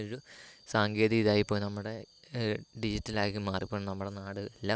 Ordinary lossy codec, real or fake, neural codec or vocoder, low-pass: none; real; none; none